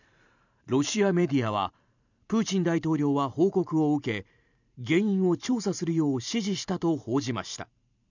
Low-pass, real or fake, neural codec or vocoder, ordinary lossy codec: 7.2 kHz; fake; vocoder, 44.1 kHz, 128 mel bands every 512 samples, BigVGAN v2; none